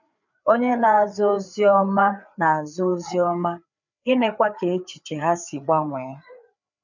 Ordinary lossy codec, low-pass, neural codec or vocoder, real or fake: none; 7.2 kHz; codec, 16 kHz, 4 kbps, FreqCodec, larger model; fake